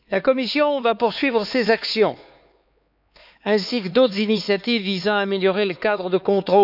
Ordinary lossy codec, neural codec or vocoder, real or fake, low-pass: none; codec, 16 kHz, 4 kbps, X-Codec, HuBERT features, trained on LibriSpeech; fake; 5.4 kHz